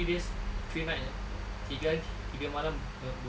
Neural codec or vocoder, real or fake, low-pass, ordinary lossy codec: none; real; none; none